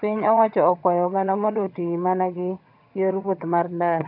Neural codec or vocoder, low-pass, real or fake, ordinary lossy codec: vocoder, 22.05 kHz, 80 mel bands, HiFi-GAN; 5.4 kHz; fake; none